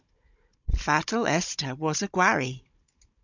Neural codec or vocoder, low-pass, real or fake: codec, 16 kHz, 16 kbps, FunCodec, trained on Chinese and English, 50 frames a second; 7.2 kHz; fake